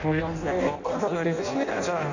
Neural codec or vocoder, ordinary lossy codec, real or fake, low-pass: codec, 16 kHz in and 24 kHz out, 0.6 kbps, FireRedTTS-2 codec; Opus, 64 kbps; fake; 7.2 kHz